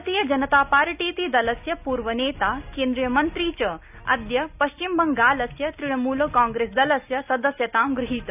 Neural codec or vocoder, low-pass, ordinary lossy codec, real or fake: none; 3.6 kHz; none; real